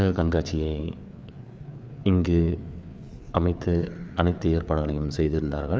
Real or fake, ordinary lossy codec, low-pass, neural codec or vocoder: fake; none; none; codec, 16 kHz, 4 kbps, FreqCodec, larger model